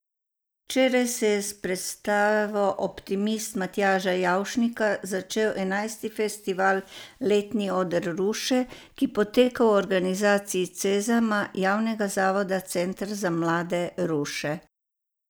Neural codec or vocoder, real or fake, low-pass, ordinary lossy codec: none; real; none; none